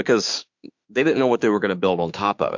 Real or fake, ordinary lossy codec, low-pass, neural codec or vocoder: fake; MP3, 64 kbps; 7.2 kHz; autoencoder, 48 kHz, 32 numbers a frame, DAC-VAE, trained on Japanese speech